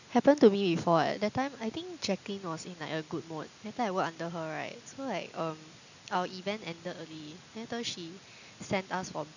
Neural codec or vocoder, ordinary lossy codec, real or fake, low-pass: none; none; real; 7.2 kHz